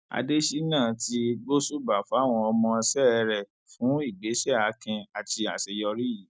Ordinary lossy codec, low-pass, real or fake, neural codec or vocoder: none; none; real; none